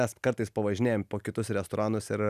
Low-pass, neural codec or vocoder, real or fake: 14.4 kHz; none; real